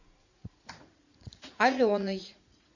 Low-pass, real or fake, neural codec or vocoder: 7.2 kHz; fake; vocoder, 44.1 kHz, 80 mel bands, Vocos